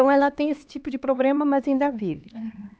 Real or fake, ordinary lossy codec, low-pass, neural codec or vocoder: fake; none; none; codec, 16 kHz, 2 kbps, X-Codec, HuBERT features, trained on LibriSpeech